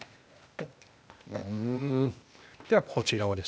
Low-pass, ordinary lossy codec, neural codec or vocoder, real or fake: none; none; codec, 16 kHz, 0.8 kbps, ZipCodec; fake